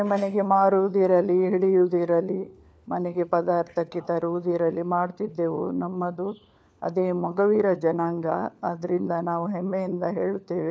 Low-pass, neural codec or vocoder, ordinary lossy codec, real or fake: none; codec, 16 kHz, 4 kbps, FunCodec, trained on LibriTTS, 50 frames a second; none; fake